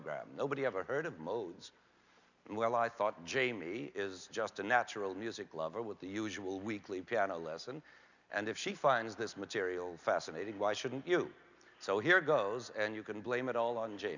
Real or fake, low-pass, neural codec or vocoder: real; 7.2 kHz; none